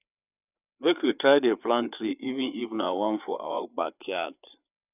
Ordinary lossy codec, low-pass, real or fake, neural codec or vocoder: none; 3.6 kHz; fake; codec, 16 kHz, 4 kbps, FreqCodec, larger model